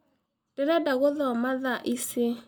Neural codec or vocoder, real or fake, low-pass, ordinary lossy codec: none; real; none; none